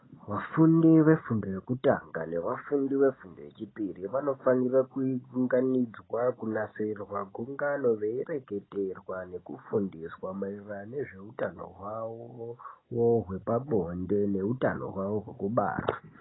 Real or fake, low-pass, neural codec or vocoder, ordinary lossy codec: real; 7.2 kHz; none; AAC, 16 kbps